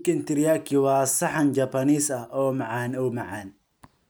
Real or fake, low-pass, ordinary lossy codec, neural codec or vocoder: real; none; none; none